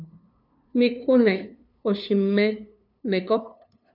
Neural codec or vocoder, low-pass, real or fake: codec, 16 kHz, 2 kbps, FunCodec, trained on LibriTTS, 25 frames a second; 5.4 kHz; fake